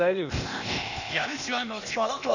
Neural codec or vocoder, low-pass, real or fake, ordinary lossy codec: codec, 16 kHz, 0.8 kbps, ZipCodec; 7.2 kHz; fake; none